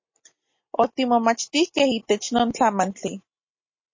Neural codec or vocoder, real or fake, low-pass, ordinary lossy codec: none; real; 7.2 kHz; MP3, 32 kbps